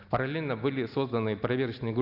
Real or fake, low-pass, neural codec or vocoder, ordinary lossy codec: real; 5.4 kHz; none; Opus, 64 kbps